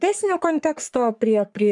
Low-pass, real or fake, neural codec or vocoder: 10.8 kHz; fake; codec, 44.1 kHz, 3.4 kbps, Pupu-Codec